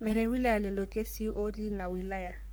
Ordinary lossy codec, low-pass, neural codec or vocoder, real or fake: none; none; codec, 44.1 kHz, 3.4 kbps, Pupu-Codec; fake